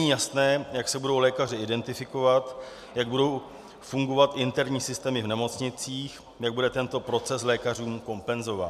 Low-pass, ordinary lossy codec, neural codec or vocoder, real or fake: 14.4 kHz; MP3, 96 kbps; none; real